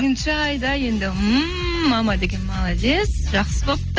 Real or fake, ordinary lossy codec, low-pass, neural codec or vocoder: real; Opus, 24 kbps; 7.2 kHz; none